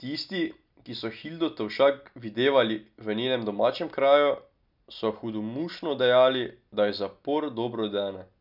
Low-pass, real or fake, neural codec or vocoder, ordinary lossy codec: 5.4 kHz; real; none; none